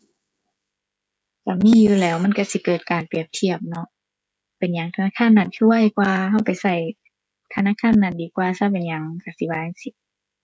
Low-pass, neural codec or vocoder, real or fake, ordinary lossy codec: none; codec, 16 kHz, 16 kbps, FreqCodec, smaller model; fake; none